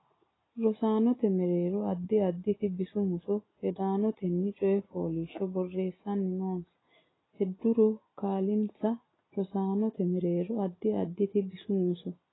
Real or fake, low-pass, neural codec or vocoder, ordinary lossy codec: real; 7.2 kHz; none; AAC, 16 kbps